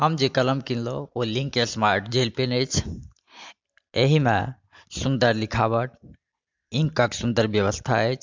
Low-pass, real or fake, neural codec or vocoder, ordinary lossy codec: 7.2 kHz; real; none; MP3, 64 kbps